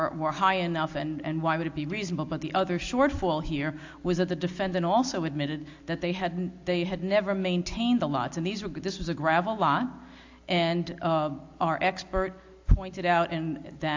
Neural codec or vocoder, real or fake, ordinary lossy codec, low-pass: none; real; AAC, 48 kbps; 7.2 kHz